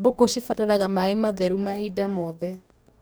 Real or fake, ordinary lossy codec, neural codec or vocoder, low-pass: fake; none; codec, 44.1 kHz, 2.6 kbps, DAC; none